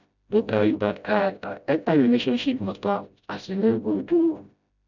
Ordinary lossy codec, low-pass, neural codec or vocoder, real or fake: none; 7.2 kHz; codec, 16 kHz, 0.5 kbps, FreqCodec, smaller model; fake